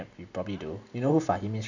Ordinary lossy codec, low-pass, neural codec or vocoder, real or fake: none; 7.2 kHz; none; real